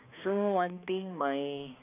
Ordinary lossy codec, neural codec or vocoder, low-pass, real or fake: none; codec, 16 kHz, 2 kbps, X-Codec, HuBERT features, trained on balanced general audio; 3.6 kHz; fake